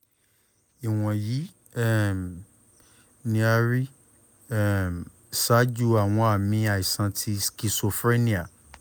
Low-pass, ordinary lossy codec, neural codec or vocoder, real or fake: none; none; none; real